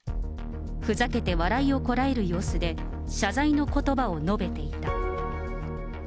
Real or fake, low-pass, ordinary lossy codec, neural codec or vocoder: real; none; none; none